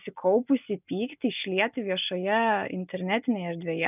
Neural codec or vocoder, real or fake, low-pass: none; real; 3.6 kHz